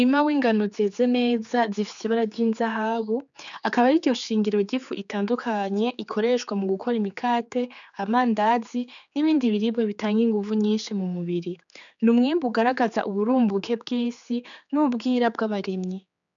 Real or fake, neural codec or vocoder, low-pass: fake; codec, 16 kHz, 4 kbps, X-Codec, HuBERT features, trained on general audio; 7.2 kHz